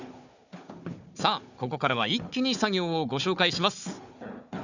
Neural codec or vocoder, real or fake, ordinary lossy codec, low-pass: codec, 16 kHz, 4 kbps, FunCodec, trained on Chinese and English, 50 frames a second; fake; none; 7.2 kHz